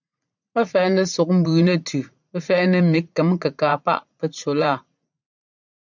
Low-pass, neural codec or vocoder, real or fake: 7.2 kHz; vocoder, 44.1 kHz, 80 mel bands, Vocos; fake